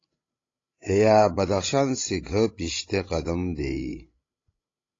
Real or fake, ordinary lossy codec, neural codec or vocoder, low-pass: fake; AAC, 32 kbps; codec, 16 kHz, 16 kbps, FreqCodec, larger model; 7.2 kHz